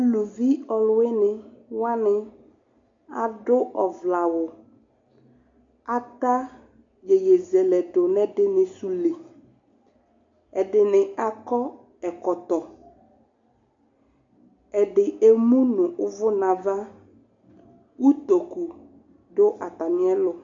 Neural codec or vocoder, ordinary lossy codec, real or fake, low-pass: none; MP3, 48 kbps; real; 7.2 kHz